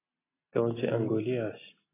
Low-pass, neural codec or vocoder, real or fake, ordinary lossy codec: 3.6 kHz; none; real; AAC, 16 kbps